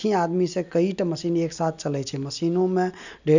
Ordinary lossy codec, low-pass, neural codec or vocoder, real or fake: none; 7.2 kHz; none; real